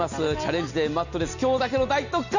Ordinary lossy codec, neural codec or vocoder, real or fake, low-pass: none; none; real; 7.2 kHz